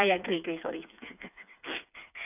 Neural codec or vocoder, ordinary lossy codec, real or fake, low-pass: codec, 16 kHz, 4 kbps, FreqCodec, smaller model; none; fake; 3.6 kHz